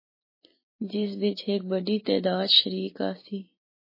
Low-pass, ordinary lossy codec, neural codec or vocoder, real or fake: 5.4 kHz; MP3, 24 kbps; none; real